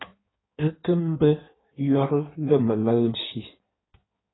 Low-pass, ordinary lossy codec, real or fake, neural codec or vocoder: 7.2 kHz; AAC, 16 kbps; fake; codec, 16 kHz in and 24 kHz out, 1.1 kbps, FireRedTTS-2 codec